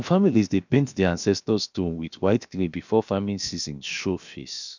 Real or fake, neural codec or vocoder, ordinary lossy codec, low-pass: fake; codec, 16 kHz, 0.7 kbps, FocalCodec; none; 7.2 kHz